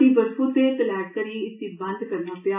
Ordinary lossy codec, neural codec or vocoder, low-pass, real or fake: MP3, 32 kbps; none; 3.6 kHz; real